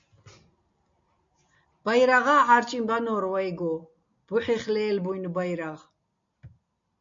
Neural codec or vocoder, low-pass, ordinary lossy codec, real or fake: none; 7.2 kHz; MP3, 48 kbps; real